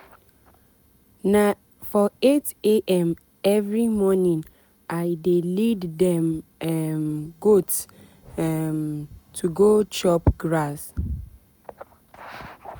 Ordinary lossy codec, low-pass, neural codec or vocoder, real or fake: none; none; none; real